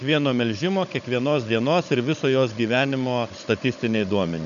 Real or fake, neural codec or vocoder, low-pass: fake; codec, 16 kHz, 16 kbps, FunCodec, trained on Chinese and English, 50 frames a second; 7.2 kHz